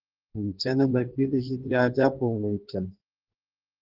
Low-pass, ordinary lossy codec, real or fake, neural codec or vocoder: 5.4 kHz; Opus, 16 kbps; fake; codec, 16 kHz in and 24 kHz out, 1.1 kbps, FireRedTTS-2 codec